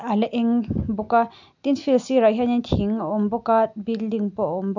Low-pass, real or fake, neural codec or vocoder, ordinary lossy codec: 7.2 kHz; real; none; none